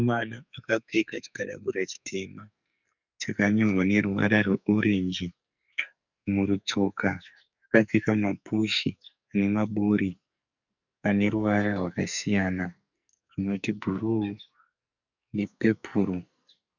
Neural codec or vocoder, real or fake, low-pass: codec, 44.1 kHz, 2.6 kbps, SNAC; fake; 7.2 kHz